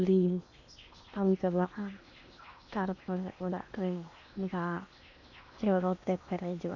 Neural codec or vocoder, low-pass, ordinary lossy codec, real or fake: codec, 16 kHz in and 24 kHz out, 0.8 kbps, FocalCodec, streaming, 65536 codes; 7.2 kHz; none; fake